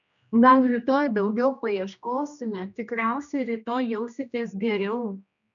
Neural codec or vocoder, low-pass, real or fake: codec, 16 kHz, 1 kbps, X-Codec, HuBERT features, trained on general audio; 7.2 kHz; fake